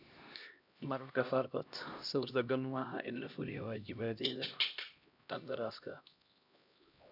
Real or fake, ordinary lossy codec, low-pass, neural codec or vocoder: fake; none; 5.4 kHz; codec, 16 kHz, 1 kbps, X-Codec, HuBERT features, trained on LibriSpeech